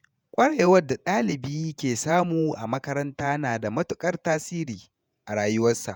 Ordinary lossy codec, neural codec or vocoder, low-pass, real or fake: none; vocoder, 48 kHz, 128 mel bands, Vocos; none; fake